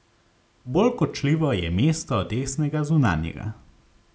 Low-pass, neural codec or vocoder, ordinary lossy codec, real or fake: none; none; none; real